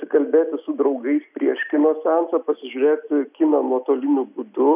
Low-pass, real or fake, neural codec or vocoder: 3.6 kHz; real; none